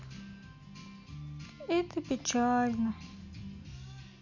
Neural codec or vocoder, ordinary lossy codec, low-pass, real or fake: none; MP3, 64 kbps; 7.2 kHz; real